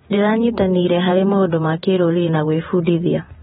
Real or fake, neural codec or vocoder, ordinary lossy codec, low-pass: fake; vocoder, 48 kHz, 128 mel bands, Vocos; AAC, 16 kbps; 19.8 kHz